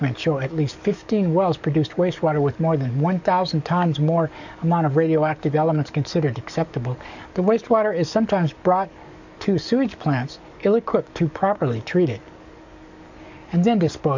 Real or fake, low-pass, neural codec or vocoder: fake; 7.2 kHz; codec, 44.1 kHz, 7.8 kbps, DAC